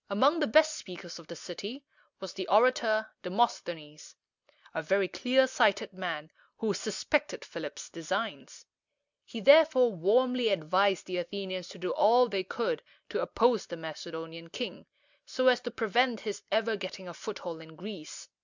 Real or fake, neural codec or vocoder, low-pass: real; none; 7.2 kHz